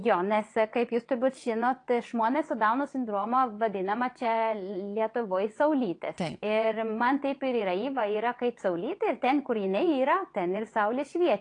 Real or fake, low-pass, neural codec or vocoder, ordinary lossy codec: fake; 9.9 kHz; vocoder, 22.05 kHz, 80 mel bands, WaveNeXt; AAC, 48 kbps